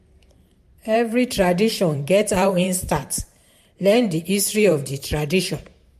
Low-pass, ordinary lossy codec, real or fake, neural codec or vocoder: 14.4 kHz; MP3, 64 kbps; fake; vocoder, 44.1 kHz, 128 mel bands every 512 samples, BigVGAN v2